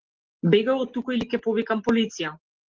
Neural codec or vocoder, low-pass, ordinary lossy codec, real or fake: none; 7.2 kHz; Opus, 16 kbps; real